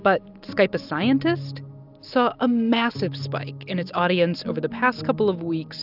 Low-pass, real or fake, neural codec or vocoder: 5.4 kHz; real; none